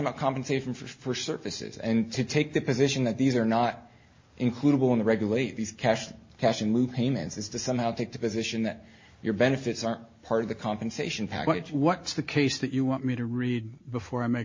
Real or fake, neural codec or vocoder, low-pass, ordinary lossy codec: real; none; 7.2 kHz; MP3, 32 kbps